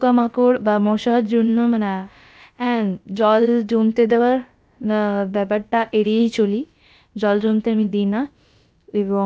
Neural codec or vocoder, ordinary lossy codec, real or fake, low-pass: codec, 16 kHz, about 1 kbps, DyCAST, with the encoder's durations; none; fake; none